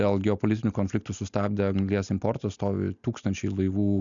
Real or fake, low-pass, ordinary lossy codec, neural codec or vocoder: real; 7.2 kHz; MP3, 96 kbps; none